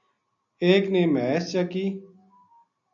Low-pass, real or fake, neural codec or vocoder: 7.2 kHz; real; none